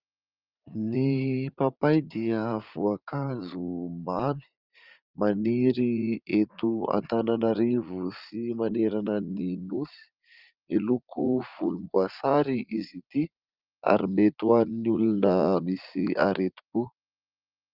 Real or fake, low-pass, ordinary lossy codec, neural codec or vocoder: fake; 5.4 kHz; Opus, 24 kbps; vocoder, 44.1 kHz, 80 mel bands, Vocos